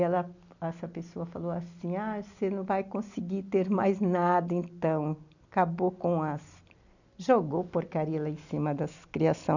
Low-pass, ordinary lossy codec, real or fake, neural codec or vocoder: 7.2 kHz; none; real; none